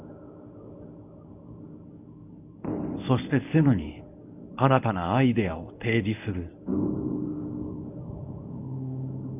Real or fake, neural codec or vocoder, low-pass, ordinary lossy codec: fake; codec, 24 kHz, 0.9 kbps, WavTokenizer, medium speech release version 1; 3.6 kHz; none